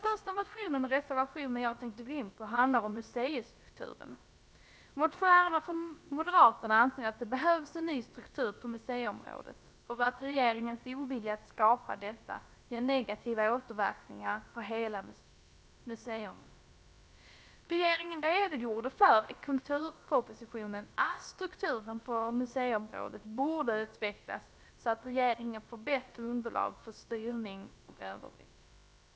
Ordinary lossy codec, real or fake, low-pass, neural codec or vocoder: none; fake; none; codec, 16 kHz, about 1 kbps, DyCAST, with the encoder's durations